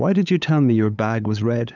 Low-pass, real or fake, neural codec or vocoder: 7.2 kHz; fake; codec, 16 kHz, 8 kbps, FunCodec, trained on LibriTTS, 25 frames a second